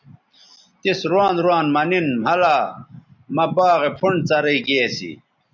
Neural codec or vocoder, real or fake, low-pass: none; real; 7.2 kHz